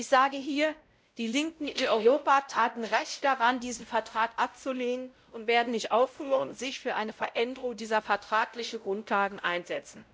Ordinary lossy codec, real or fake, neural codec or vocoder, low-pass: none; fake; codec, 16 kHz, 0.5 kbps, X-Codec, WavLM features, trained on Multilingual LibriSpeech; none